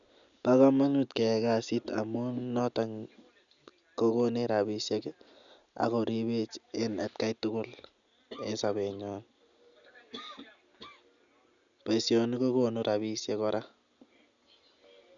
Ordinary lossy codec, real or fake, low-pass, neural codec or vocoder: none; real; 7.2 kHz; none